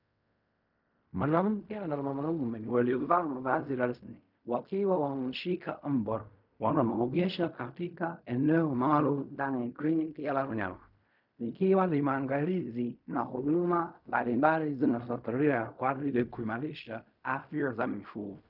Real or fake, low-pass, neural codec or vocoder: fake; 5.4 kHz; codec, 16 kHz in and 24 kHz out, 0.4 kbps, LongCat-Audio-Codec, fine tuned four codebook decoder